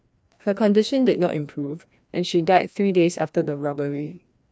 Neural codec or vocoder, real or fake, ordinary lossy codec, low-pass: codec, 16 kHz, 1 kbps, FreqCodec, larger model; fake; none; none